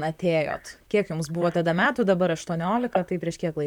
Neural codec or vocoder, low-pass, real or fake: vocoder, 44.1 kHz, 128 mel bands, Pupu-Vocoder; 19.8 kHz; fake